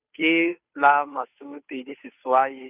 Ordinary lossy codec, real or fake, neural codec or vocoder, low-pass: none; fake; codec, 16 kHz, 2 kbps, FunCodec, trained on Chinese and English, 25 frames a second; 3.6 kHz